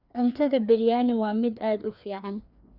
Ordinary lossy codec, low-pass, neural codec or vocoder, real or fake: none; 5.4 kHz; codec, 16 kHz, 2 kbps, FreqCodec, larger model; fake